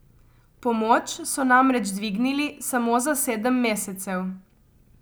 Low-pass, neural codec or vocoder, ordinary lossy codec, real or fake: none; none; none; real